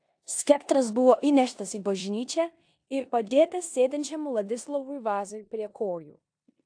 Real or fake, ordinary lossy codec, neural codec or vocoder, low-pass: fake; AAC, 64 kbps; codec, 16 kHz in and 24 kHz out, 0.9 kbps, LongCat-Audio-Codec, four codebook decoder; 9.9 kHz